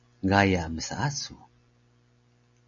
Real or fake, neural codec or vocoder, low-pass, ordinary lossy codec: real; none; 7.2 kHz; MP3, 64 kbps